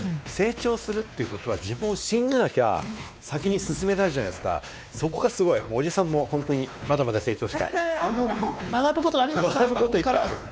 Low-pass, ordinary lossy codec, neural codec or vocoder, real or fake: none; none; codec, 16 kHz, 2 kbps, X-Codec, WavLM features, trained on Multilingual LibriSpeech; fake